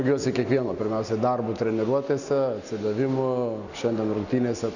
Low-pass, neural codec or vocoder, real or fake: 7.2 kHz; none; real